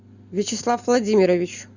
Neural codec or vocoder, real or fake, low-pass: none; real; 7.2 kHz